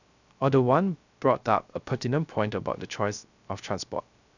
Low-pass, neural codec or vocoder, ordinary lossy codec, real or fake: 7.2 kHz; codec, 16 kHz, 0.3 kbps, FocalCodec; none; fake